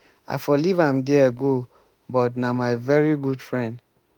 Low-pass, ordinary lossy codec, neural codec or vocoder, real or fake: 19.8 kHz; Opus, 32 kbps; autoencoder, 48 kHz, 32 numbers a frame, DAC-VAE, trained on Japanese speech; fake